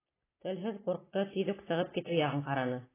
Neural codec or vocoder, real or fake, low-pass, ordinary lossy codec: none; real; 3.6 kHz; AAC, 16 kbps